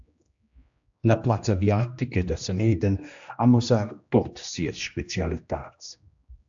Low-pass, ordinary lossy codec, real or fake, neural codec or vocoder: 7.2 kHz; AAC, 64 kbps; fake; codec, 16 kHz, 1 kbps, X-Codec, HuBERT features, trained on general audio